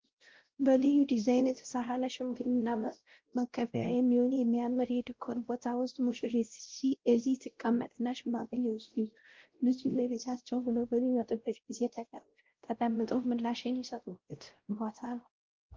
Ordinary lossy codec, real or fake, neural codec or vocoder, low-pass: Opus, 16 kbps; fake; codec, 16 kHz, 0.5 kbps, X-Codec, WavLM features, trained on Multilingual LibriSpeech; 7.2 kHz